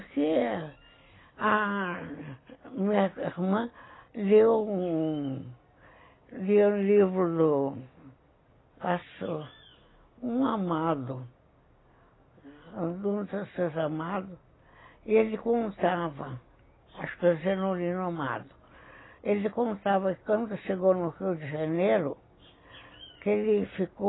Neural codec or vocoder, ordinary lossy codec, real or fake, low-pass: none; AAC, 16 kbps; real; 7.2 kHz